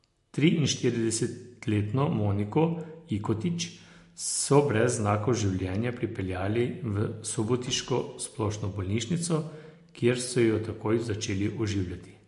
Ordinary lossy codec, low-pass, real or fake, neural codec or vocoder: MP3, 48 kbps; 14.4 kHz; real; none